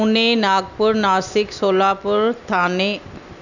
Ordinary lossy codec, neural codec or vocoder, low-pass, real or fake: none; none; 7.2 kHz; real